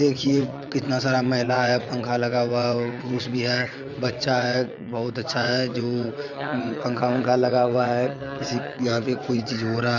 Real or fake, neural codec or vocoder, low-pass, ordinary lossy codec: fake; vocoder, 22.05 kHz, 80 mel bands, WaveNeXt; 7.2 kHz; none